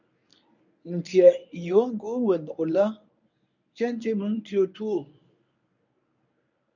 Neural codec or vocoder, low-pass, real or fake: codec, 24 kHz, 0.9 kbps, WavTokenizer, medium speech release version 1; 7.2 kHz; fake